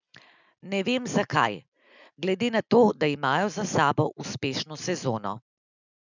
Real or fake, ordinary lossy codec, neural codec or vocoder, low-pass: real; none; none; 7.2 kHz